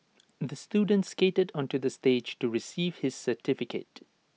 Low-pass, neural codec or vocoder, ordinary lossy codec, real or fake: none; none; none; real